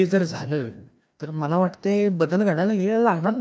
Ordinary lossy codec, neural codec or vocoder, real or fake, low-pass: none; codec, 16 kHz, 1 kbps, FreqCodec, larger model; fake; none